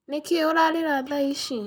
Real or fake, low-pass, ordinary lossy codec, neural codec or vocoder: fake; none; none; vocoder, 44.1 kHz, 128 mel bands, Pupu-Vocoder